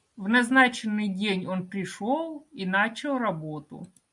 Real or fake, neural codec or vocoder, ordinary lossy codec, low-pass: real; none; MP3, 96 kbps; 10.8 kHz